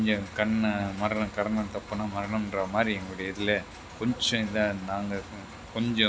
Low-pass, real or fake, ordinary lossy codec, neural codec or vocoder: none; real; none; none